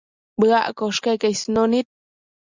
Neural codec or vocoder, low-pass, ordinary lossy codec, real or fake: none; 7.2 kHz; Opus, 64 kbps; real